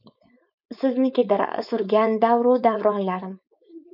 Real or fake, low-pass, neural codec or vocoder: fake; 5.4 kHz; codec, 16 kHz, 4.8 kbps, FACodec